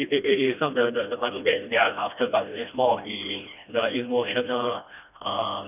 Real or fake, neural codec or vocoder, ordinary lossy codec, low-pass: fake; codec, 16 kHz, 1 kbps, FreqCodec, smaller model; none; 3.6 kHz